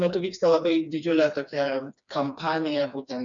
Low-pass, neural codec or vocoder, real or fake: 7.2 kHz; codec, 16 kHz, 2 kbps, FreqCodec, smaller model; fake